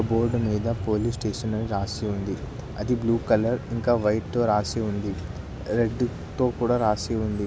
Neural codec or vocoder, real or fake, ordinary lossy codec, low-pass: none; real; none; none